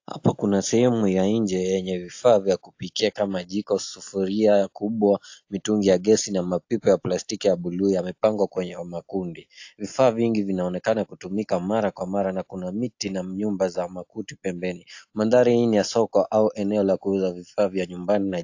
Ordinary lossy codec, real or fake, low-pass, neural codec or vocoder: AAC, 48 kbps; real; 7.2 kHz; none